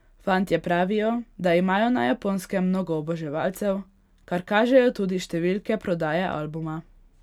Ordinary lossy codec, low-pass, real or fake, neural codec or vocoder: none; 19.8 kHz; real; none